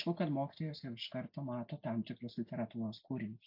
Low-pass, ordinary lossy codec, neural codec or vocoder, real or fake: 5.4 kHz; AAC, 48 kbps; none; real